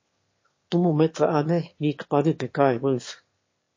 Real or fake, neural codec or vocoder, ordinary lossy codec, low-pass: fake; autoencoder, 22.05 kHz, a latent of 192 numbers a frame, VITS, trained on one speaker; MP3, 32 kbps; 7.2 kHz